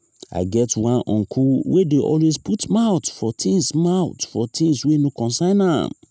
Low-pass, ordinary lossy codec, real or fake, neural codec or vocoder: none; none; real; none